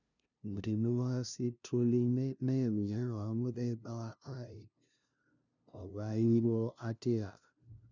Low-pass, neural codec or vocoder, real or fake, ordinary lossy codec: 7.2 kHz; codec, 16 kHz, 0.5 kbps, FunCodec, trained on LibriTTS, 25 frames a second; fake; none